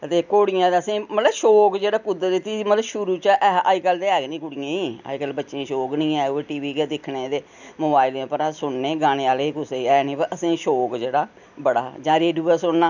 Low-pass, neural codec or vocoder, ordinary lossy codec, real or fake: 7.2 kHz; none; none; real